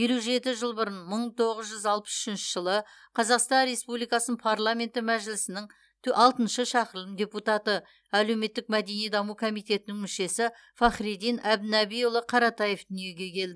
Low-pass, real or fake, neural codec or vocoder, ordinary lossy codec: none; real; none; none